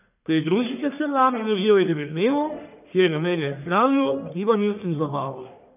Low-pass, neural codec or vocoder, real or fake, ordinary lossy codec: 3.6 kHz; codec, 44.1 kHz, 1.7 kbps, Pupu-Codec; fake; none